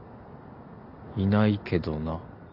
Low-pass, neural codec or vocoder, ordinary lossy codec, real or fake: 5.4 kHz; none; AAC, 48 kbps; real